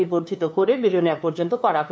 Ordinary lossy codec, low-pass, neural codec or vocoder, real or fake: none; none; codec, 16 kHz, 2 kbps, FunCodec, trained on LibriTTS, 25 frames a second; fake